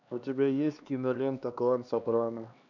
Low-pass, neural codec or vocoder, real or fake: 7.2 kHz; codec, 16 kHz, 2 kbps, X-Codec, HuBERT features, trained on LibriSpeech; fake